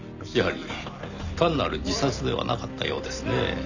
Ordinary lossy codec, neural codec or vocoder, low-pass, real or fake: none; none; 7.2 kHz; real